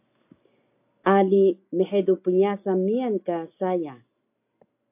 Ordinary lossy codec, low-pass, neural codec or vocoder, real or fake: AAC, 32 kbps; 3.6 kHz; none; real